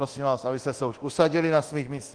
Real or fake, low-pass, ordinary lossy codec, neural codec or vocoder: fake; 9.9 kHz; Opus, 16 kbps; codec, 24 kHz, 0.9 kbps, DualCodec